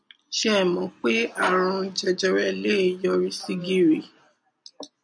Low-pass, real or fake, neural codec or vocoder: 9.9 kHz; real; none